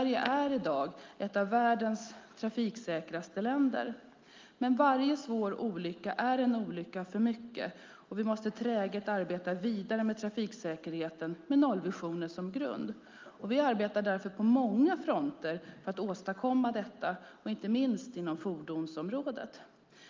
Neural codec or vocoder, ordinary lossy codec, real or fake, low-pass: none; Opus, 32 kbps; real; 7.2 kHz